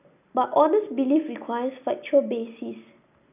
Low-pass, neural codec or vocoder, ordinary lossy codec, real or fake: 3.6 kHz; none; none; real